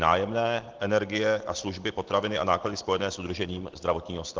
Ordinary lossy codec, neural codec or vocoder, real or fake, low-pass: Opus, 16 kbps; none; real; 7.2 kHz